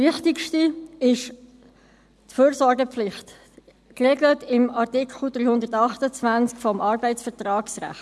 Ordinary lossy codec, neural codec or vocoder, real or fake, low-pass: none; none; real; none